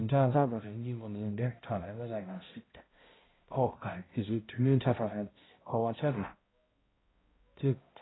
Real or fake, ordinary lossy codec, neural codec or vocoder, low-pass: fake; AAC, 16 kbps; codec, 16 kHz, 0.5 kbps, X-Codec, HuBERT features, trained on balanced general audio; 7.2 kHz